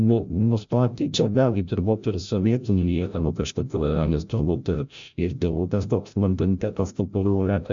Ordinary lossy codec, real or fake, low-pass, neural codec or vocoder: MP3, 64 kbps; fake; 7.2 kHz; codec, 16 kHz, 0.5 kbps, FreqCodec, larger model